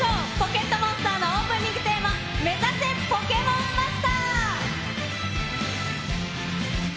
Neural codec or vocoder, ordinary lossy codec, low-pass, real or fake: none; none; none; real